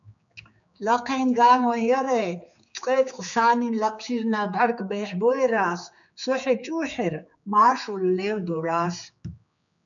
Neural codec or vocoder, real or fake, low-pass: codec, 16 kHz, 4 kbps, X-Codec, HuBERT features, trained on general audio; fake; 7.2 kHz